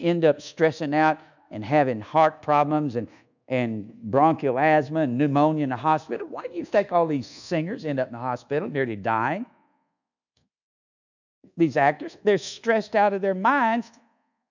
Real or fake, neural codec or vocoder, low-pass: fake; codec, 24 kHz, 1.2 kbps, DualCodec; 7.2 kHz